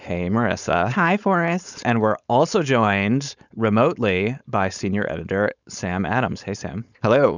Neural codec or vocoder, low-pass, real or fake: codec, 16 kHz, 4.8 kbps, FACodec; 7.2 kHz; fake